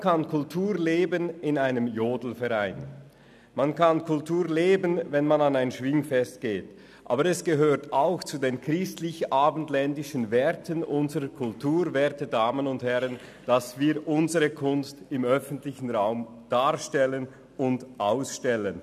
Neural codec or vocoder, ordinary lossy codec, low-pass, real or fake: none; none; 14.4 kHz; real